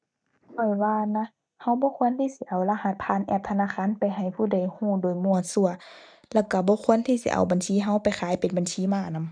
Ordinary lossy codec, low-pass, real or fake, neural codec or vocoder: none; 9.9 kHz; real; none